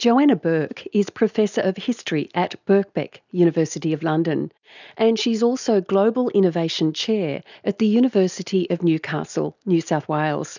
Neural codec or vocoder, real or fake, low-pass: none; real; 7.2 kHz